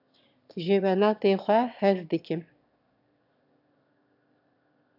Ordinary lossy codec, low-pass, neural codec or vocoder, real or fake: AAC, 48 kbps; 5.4 kHz; autoencoder, 22.05 kHz, a latent of 192 numbers a frame, VITS, trained on one speaker; fake